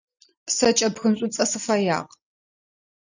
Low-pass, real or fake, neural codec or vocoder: 7.2 kHz; real; none